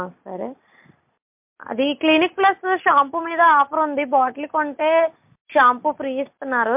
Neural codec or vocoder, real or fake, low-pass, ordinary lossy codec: none; real; 3.6 kHz; MP3, 32 kbps